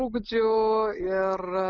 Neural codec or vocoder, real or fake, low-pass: none; real; 7.2 kHz